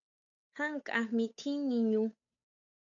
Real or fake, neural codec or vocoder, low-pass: fake; codec, 16 kHz, 8 kbps, FunCodec, trained on Chinese and English, 25 frames a second; 7.2 kHz